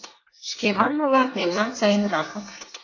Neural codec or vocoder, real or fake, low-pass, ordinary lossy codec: codec, 24 kHz, 1 kbps, SNAC; fake; 7.2 kHz; AAC, 48 kbps